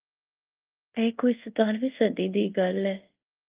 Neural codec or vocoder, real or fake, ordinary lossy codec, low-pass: codec, 24 kHz, 0.5 kbps, DualCodec; fake; Opus, 32 kbps; 3.6 kHz